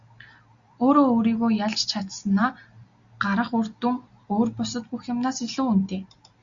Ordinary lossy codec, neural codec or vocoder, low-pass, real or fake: Opus, 64 kbps; none; 7.2 kHz; real